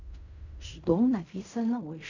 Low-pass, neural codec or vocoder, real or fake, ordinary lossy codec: 7.2 kHz; codec, 16 kHz in and 24 kHz out, 0.4 kbps, LongCat-Audio-Codec, fine tuned four codebook decoder; fake; none